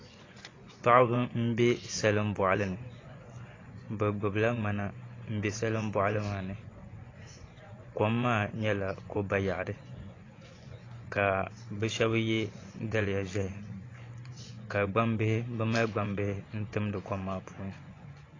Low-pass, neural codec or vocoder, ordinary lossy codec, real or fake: 7.2 kHz; vocoder, 24 kHz, 100 mel bands, Vocos; AAC, 32 kbps; fake